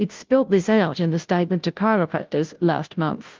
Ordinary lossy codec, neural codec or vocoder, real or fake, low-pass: Opus, 32 kbps; codec, 16 kHz, 0.5 kbps, FunCodec, trained on Chinese and English, 25 frames a second; fake; 7.2 kHz